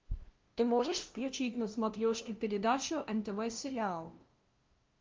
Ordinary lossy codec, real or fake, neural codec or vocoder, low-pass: Opus, 32 kbps; fake; codec, 16 kHz, 0.5 kbps, FunCodec, trained on LibriTTS, 25 frames a second; 7.2 kHz